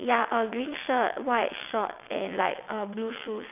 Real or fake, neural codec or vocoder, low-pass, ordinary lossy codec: fake; vocoder, 22.05 kHz, 80 mel bands, WaveNeXt; 3.6 kHz; none